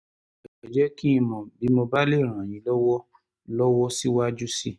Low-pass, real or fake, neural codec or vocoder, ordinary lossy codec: none; real; none; none